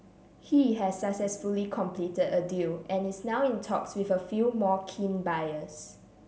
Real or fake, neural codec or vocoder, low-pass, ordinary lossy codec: real; none; none; none